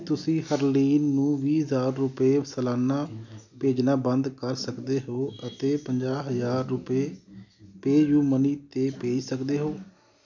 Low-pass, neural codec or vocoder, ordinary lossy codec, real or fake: 7.2 kHz; none; none; real